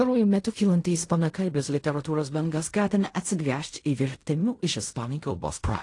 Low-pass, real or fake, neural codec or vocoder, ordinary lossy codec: 10.8 kHz; fake; codec, 16 kHz in and 24 kHz out, 0.4 kbps, LongCat-Audio-Codec, fine tuned four codebook decoder; AAC, 48 kbps